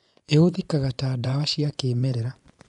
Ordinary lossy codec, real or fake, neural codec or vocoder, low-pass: none; fake; vocoder, 24 kHz, 100 mel bands, Vocos; 10.8 kHz